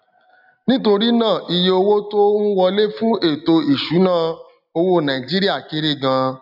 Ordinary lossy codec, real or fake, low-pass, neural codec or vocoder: none; real; 5.4 kHz; none